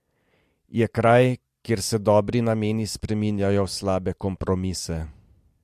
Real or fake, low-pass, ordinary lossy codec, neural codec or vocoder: real; 14.4 kHz; MP3, 64 kbps; none